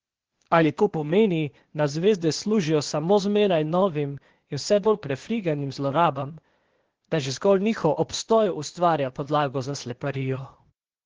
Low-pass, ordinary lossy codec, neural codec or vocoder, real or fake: 7.2 kHz; Opus, 16 kbps; codec, 16 kHz, 0.8 kbps, ZipCodec; fake